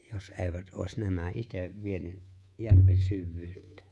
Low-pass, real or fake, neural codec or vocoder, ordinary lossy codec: none; fake; codec, 24 kHz, 3.1 kbps, DualCodec; none